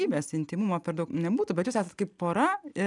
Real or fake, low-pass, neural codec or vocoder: real; 10.8 kHz; none